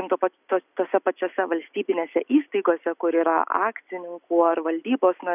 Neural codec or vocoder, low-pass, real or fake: none; 3.6 kHz; real